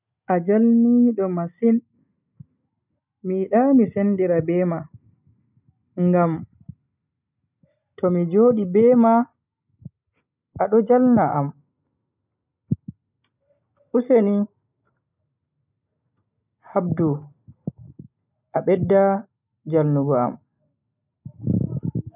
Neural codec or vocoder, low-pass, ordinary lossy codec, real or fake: none; 3.6 kHz; none; real